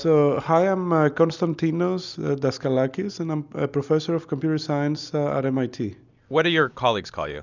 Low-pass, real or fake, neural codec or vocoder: 7.2 kHz; real; none